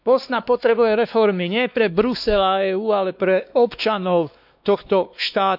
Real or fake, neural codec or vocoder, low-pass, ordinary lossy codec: fake; codec, 16 kHz, 2 kbps, X-Codec, WavLM features, trained on Multilingual LibriSpeech; 5.4 kHz; none